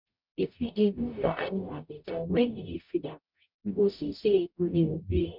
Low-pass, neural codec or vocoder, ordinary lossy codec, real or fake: 5.4 kHz; codec, 44.1 kHz, 0.9 kbps, DAC; MP3, 48 kbps; fake